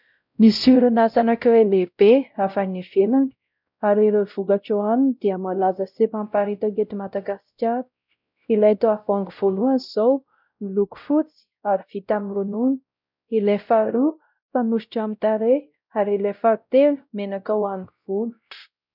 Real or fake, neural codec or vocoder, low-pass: fake; codec, 16 kHz, 0.5 kbps, X-Codec, WavLM features, trained on Multilingual LibriSpeech; 5.4 kHz